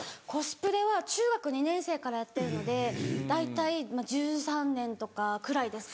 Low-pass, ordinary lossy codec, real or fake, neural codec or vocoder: none; none; real; none